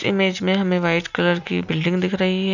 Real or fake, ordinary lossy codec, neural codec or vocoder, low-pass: real; none; none; 7.2 kHz